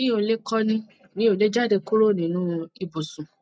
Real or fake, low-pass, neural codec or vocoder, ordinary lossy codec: real; none; none; none